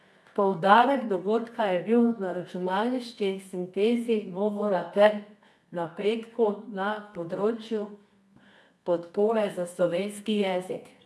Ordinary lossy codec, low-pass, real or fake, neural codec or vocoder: none; none; fake; codec, 24 kHz, 0.9 kbps, WavTokenizer, medium music audio release